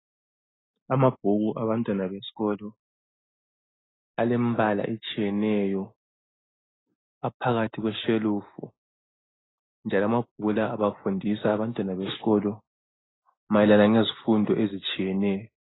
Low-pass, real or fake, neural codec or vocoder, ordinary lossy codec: 7.2 kHz; real; none; AAC, 16 kbps